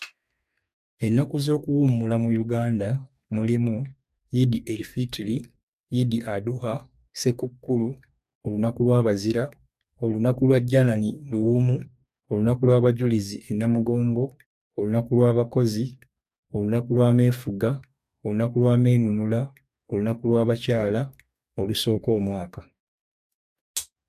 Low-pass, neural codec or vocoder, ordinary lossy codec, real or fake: 14.4 kHz; codec, 44.1 kHz, 2.6 kbps, DAC; AAC, 96 kbps; fake